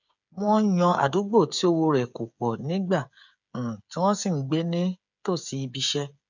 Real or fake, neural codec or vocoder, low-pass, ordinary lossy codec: fake; codec, 16 kHz, 8 kbps, FreqCodec, smaller model; 7.2 kHz; none